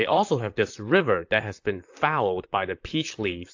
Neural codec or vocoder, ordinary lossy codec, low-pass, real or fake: vocoder, 44.1 kHz, 128 mel bands, Pupu-Vocoder; AAC, 48 kbps; 7.2 kHz; fake